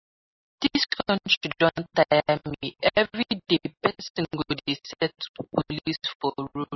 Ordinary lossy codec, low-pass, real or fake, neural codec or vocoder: MP3, 24 kbps; 7.2 kHz; real; none